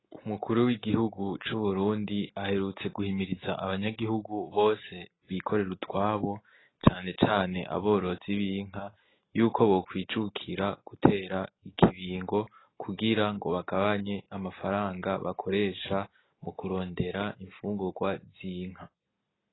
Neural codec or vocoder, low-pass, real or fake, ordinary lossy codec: none; 7.2 kHz; real; AAC, 16 kbps